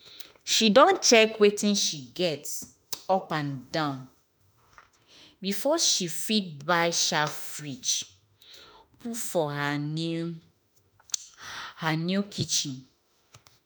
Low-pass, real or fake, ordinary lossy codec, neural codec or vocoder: none; fake; none; autoencoder, 48 kHz, 32 numbers a frame, DAC-VAE, trained on Japanese speech